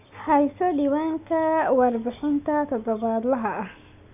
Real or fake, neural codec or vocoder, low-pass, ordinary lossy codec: real; none; 3.6 kHz; none